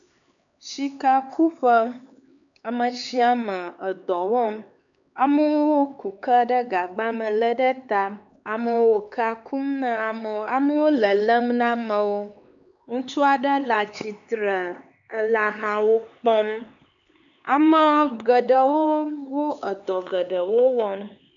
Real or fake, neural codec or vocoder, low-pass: fake; codec, 16 kHz, 4 kbps, X-Codec, HuBERT features, trained on LibriSpeech; 7.2 kHz